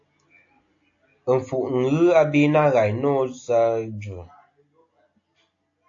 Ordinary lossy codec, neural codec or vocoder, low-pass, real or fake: AAC, 48 kbps; none; 7.2 kHz; real